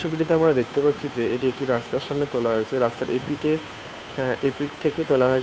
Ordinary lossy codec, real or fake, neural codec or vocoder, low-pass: none; fake; codec, 16 kHz, 2 kbps, FunCodec, trained on Chinese and English, 25 frames a second; none